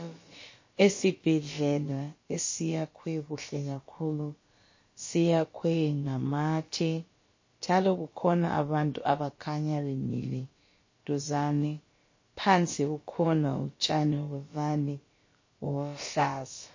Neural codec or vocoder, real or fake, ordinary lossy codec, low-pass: codec, 16 kHz, about 1 kbps, DyCAST, with the encoder's durations; fake; MP3, 32 kbps; 7.2 kHz